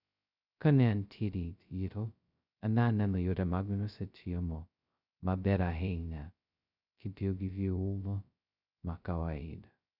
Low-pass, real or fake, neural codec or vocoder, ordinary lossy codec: 5.4 kHz; fake; codec, 16 kHz, 0.2 kbps, FocalCodec; none